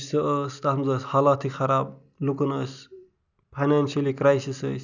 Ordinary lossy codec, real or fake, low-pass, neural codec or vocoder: none; real; 7.2 kHz; none